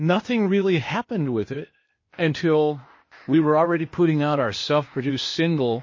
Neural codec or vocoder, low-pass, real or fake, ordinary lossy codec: codec, 16 kHz, 0.8 kbps, ZipCodec; 7.2 kHz; fake; MP3, 32 kbps